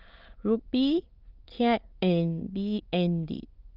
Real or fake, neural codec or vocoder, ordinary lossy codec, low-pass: fake; autoencoder, 22.05 kHz, a latent of 192 numbers a frame, VITS, trained on many speakers; Opus, 24 kbps; 5.4 kHz